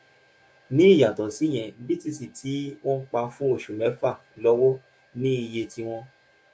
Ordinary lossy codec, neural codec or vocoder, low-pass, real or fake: none; codec, 16 kHz, 6 kbps, DAC; none; fake